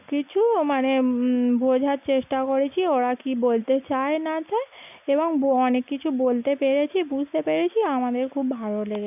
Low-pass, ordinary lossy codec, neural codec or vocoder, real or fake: 3.6 kHz; none; none; real